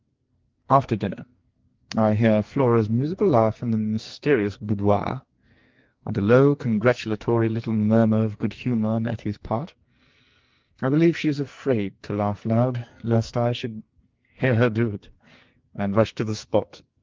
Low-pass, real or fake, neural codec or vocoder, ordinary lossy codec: 7.2 kHz; fake; codec, 44.1 kHz, 2.6 kbps, SNAC; Opus, 32 kbps